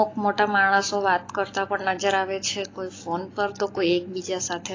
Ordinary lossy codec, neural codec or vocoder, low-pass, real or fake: AAC, 32 kbps; none; 7.2 kHz; real